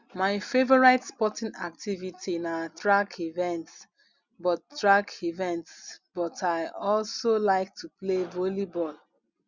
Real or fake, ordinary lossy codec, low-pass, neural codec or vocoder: real; Opus, 64 kbps; 7.2 kHz; none